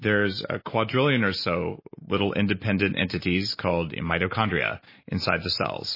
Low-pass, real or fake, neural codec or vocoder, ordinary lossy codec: 5.4 kHz; real; none; MP3, 24 kbps